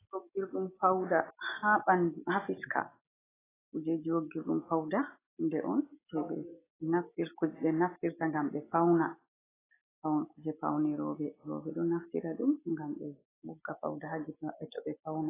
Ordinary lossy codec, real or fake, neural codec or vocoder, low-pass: AAC, 16 kbps; real; none; 3.6 kHz